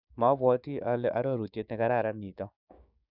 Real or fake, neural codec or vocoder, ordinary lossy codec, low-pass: fake; codec, 16 kHz, 6 kbps, DAC; none; 5.4 kHz